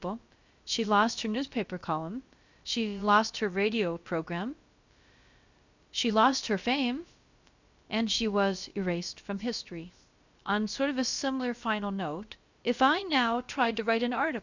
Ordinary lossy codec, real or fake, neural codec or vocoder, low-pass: Opus, 64 kbps; fake; codec, 16 kHz, about 1 kbps, DyCAST, with the encoder's durations; 7.2 kHz